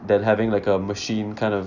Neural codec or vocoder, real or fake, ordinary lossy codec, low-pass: none; real; none; 7.2 kHz